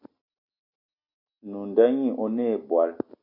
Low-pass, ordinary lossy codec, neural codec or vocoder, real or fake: 5.4 kHz; MP3, 48 kbps; none; real